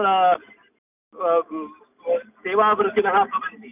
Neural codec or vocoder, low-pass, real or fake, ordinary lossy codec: none; 3.6 kHz; real; none